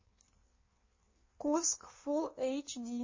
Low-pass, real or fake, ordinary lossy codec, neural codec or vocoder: 7.2 kHz; fake; MP3, 32 kbps; codec, 16 kHz in and 24 kHz out, 1.1 kbps, FireRedTTS-2 codec